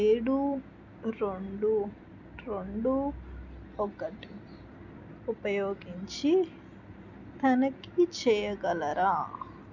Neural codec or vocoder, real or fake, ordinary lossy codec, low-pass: none; real; none; 7.2 kHz